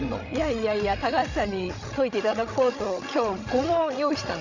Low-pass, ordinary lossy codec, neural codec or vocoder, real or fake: 7.2 kHz; none; codec, 16 kHz, 16 kbps, FreqCodec, larger model; fake